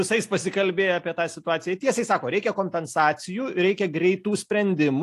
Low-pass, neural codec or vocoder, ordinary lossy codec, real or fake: 14.4 kHz; none; AAC, 64 kbps; real